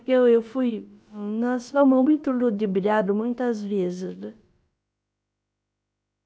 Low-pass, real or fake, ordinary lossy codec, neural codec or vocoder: none; fake; none; codec, 16 kHz, about 1 kbps, DyCAST, with the encoder's durations